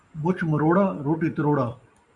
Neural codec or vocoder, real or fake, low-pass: none; real; 10.8 kHz